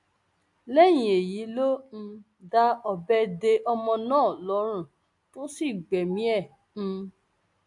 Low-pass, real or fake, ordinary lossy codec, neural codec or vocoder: 10.8 kHz; real; AAC, 64 kbps; none